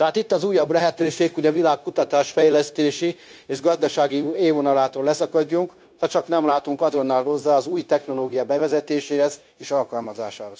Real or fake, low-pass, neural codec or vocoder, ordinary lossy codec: fake; none; codec, 16 kHz, 0.9 kbps, LongCat-Audio-Codec; none